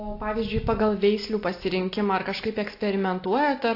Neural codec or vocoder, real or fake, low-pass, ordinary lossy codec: none; real; 5.4 kHz; AAC, 32 kbps